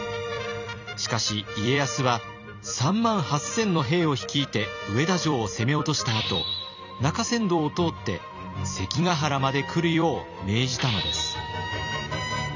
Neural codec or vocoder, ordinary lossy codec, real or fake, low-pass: vocoder, 44.1 kHz, 128 mel bands every 256 samples, BigVGAN v2; none; fake; 7.2 kHz